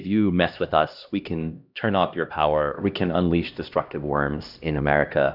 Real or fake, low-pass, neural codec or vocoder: fake; 5.4 kHz; codec, 16 kHz, 1 kbps, X-Codec, HuBERT features, trained on LibriSpeech